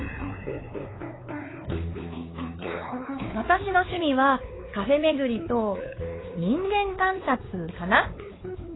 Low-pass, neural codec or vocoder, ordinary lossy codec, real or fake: 7.2 kHz; codec, 16 kHz, 4 kbps, X-Codec, WavLM features, trained on Multilingual LibriSpeech; AAC, 16 kbps; fake